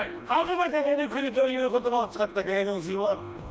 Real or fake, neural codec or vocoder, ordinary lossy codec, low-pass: fake; codec, 16 kHz, 1 kbps, FreqCodec, smaller model; none; none